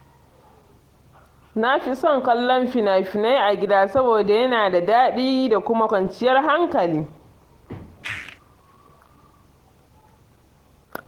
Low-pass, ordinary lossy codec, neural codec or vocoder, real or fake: 19.8 kHz; Opus, 16 kbps; none; real